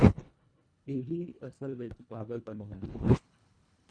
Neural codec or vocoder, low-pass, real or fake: codec, 24 kHz, 1.5 kbps, HILCodec; 9.9 kHz; fake